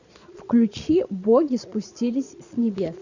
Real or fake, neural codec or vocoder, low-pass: fake; vocoder, 22.05 kHz, 80 mel bands, Vocos; 7.2 kHz